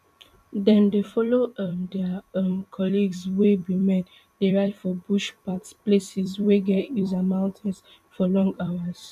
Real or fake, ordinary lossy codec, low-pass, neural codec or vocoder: fake; none; 14.4 kHz; vocoder, 44.1 kHz, 128 mel bands every 256 samples, BigVGAN v2